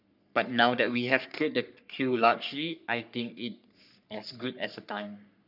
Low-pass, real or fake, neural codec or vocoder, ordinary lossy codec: 5.4 kHz; fake; codec, 44.1 kHz, 3.4 kbps, Pupu-Codec; none